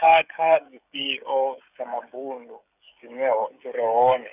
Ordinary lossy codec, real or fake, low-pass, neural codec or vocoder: none; fake; 3.6 kHz; codec, 16 kHz, 16 kbps, FreqCodec, smaller model